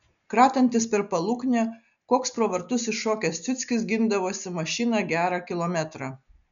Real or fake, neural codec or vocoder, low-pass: real; none; 7.2 kHz